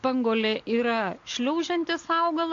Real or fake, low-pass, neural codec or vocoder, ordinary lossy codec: real; 7.2 kHz; none; AAC, 48 kbps